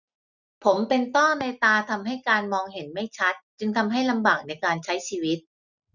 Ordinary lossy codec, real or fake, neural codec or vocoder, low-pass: none; real; none; 7.2 kHz